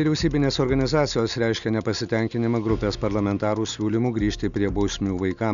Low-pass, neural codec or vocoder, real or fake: 7.2 kHz; none; real